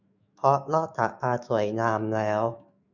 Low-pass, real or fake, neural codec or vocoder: 7.2 kHz; fake; autoencoder, 48 kHz, 128 numbers a frame, DAC-VAE, trained on Japanese speech